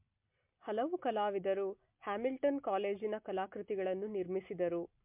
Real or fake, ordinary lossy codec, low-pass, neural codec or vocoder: real; MP3, 32 kbps; 3.6 kHz; none